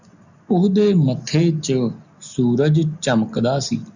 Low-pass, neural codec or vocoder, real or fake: 7.2 kHz; none; real